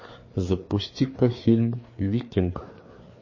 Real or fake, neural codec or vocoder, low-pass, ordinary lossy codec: fake; codec, 16 kHz, 4 kbps, FreqCodec, larger model; 7.2 kHz; MP3, 32 kbps